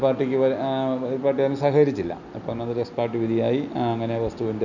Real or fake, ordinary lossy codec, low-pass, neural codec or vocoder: real; none; 7.2 kHz; none